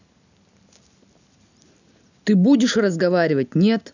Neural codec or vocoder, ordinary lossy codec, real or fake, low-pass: none; none; real; 7.2 kHz